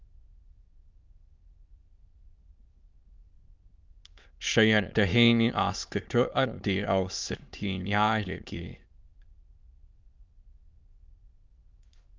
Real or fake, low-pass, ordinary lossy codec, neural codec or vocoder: fake; 7.2 kHz; Opus, 24 kbps; autoencoder, 22.05 kHz, a latent of 192 numbers a frame, VITS, trained on many speakers